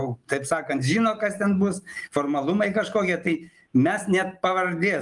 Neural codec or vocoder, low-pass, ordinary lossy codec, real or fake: none; 10.8 kHz; Opus, 32 kbps; real